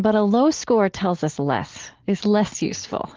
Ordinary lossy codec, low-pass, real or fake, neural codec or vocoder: Opus, 16 kbps; 7.2 kHz; real; none